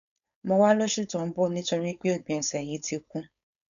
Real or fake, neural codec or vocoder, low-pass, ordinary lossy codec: fake; codec, 16 kHz, 4.8 kbps, FACodec; 7.2 kHz; none